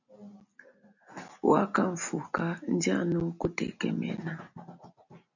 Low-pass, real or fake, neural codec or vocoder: 7.2 kHz; real; none